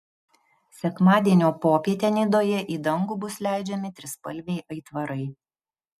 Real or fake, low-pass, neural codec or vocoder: real; 14.4 kHz; none